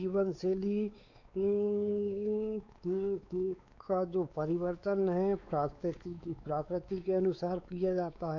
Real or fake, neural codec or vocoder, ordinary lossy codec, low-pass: fake; codec, 16 kHz, 4 kbps, X-Codec, WavLM features, trained on Multilingual LibriSpeech; AAC, 48 kbps; 7.2 kHz